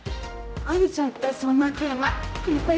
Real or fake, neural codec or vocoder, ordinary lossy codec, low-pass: fake; codec, 16 kHz, 0.5 kbps, X-Codec, HuBERT features, trained on general audio; none; none